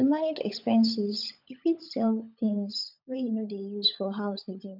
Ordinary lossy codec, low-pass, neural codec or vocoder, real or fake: none; 5.4 kHz; codec, 16 kHz, 16 kbps, FunCodec, trained on LibriTTS, 50 frames a second; fake